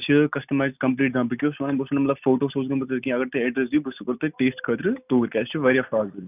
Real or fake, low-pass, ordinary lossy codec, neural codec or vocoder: real; 3.6 kHz; none; none